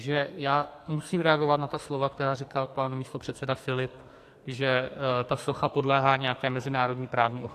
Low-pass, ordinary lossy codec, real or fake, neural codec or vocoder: 14.4 kHz; AAC, 64 kbps; fake; codec, 44.1 kHz, 2.6 kbps, SNAC